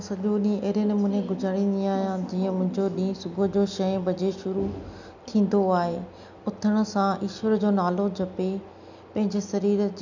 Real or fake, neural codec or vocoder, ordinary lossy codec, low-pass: real; none; none; 7.2 kHz